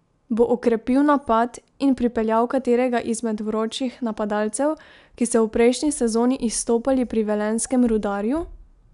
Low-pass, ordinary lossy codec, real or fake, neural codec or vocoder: 10.8 kHz; none; real; none